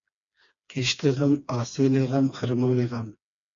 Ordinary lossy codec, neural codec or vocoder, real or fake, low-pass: MP3, 64 kbps; codec, 16 kHz, 2 kbps, FreqCodec, smaller model; fake; 7.2 kHz